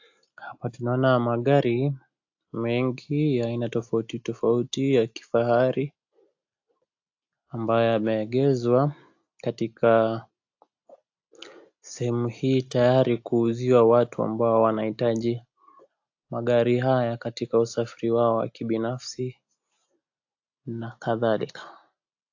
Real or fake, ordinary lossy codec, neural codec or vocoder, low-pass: real; AAC, 48 kbps; none; 7.2 kHz